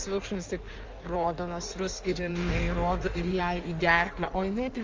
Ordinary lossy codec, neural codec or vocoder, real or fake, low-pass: Opus, 32 kbps; codec, 16 kHz in and 24 kHz out, 1.1 kbps, FireRedTTS-2 codec; fake; 7.2 kHz